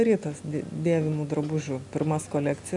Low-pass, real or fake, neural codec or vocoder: 10.8 kHz; real; none